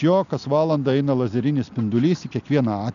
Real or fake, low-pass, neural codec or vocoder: real; 7.2 kHz; none